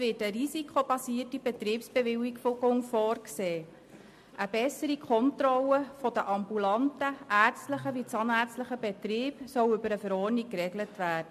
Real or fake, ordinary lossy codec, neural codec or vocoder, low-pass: real; MP3, 64 kbps; none; 14.4 kHz